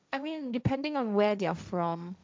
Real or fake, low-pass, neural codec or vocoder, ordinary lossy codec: fake; none; codec, 16 kHz, 1.1 kbps, Voila-Tokenizer; none